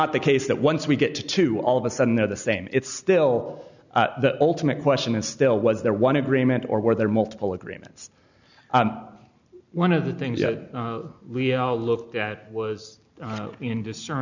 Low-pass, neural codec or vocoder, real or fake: 7.2 kHz; none; real